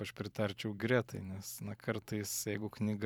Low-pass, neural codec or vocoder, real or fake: 19.8 kHz; vocoder, 44.1 kHz, 128 mel bands every 512 samples, BigVGAN v2; fake